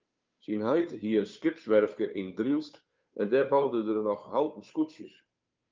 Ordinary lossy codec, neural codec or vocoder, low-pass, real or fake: Opus, 24 kbps; codec, 16 kHz, 2 kbps, FunCodec, trained on Chinese and English, 25 frames a second; 7.2 kHz; fake